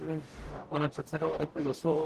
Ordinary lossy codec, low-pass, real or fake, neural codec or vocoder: Opus, 16 kbps; 14.4 kHz; fake; codec, 44.1 kHz, 0.9 kbps, DAC